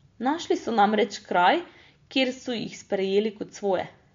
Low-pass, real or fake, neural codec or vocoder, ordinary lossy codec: 7.2 kHz; real; none; MP3, 48 kbps